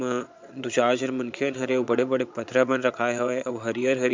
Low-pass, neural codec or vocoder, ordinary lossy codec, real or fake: 7.2 kHz; vocoder, 22.05 kHz, 80 mel bands, Vocos; MP3, 64 kbps; fake